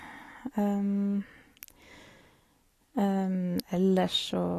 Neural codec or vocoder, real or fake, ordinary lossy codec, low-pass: none; real; AAC, 48 kbps; 14.4 kHz